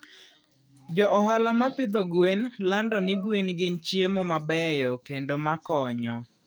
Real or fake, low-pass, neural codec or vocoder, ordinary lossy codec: fake; none; codec, 44.1 kHz, 2.6 kbps, SNAC; none